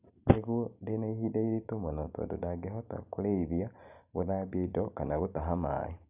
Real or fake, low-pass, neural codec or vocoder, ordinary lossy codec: real; 3.6 kHz; none; none